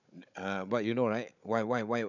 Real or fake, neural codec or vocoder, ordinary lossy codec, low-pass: fake; codec, 16 kHz, 16 kbps, FunCodec, trained on Chinese and English, 50 frames a second; none; 7.2 kHz